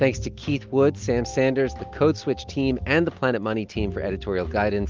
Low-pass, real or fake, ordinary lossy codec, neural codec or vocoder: 7.2 kHz; real; Opus, 32 kbps; none